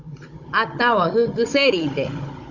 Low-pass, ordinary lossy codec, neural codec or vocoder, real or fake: 7.2 kHz; Opus, 64 kbps; codec, 16 kHz, 16 kbps, FunCodec, trained on Chinese and English, 50 frames a second; fake